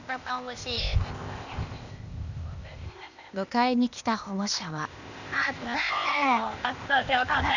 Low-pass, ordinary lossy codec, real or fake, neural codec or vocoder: 7.2 kHz; none; fake; codec, 16 kHz, 0.8 kbps, ZipCodec